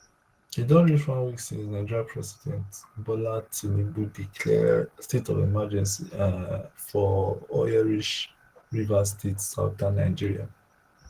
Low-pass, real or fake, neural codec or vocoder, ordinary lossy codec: 14.4 kHz; real; none; Opus, 16 kbps